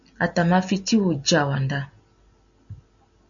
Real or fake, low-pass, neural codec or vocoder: real; 7.2 kHz; none